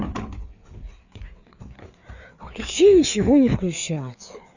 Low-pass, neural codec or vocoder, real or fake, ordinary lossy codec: 7.2 kHz; codec, 16 kHz, 4 kbps, FreqCodec, larger model; fake; none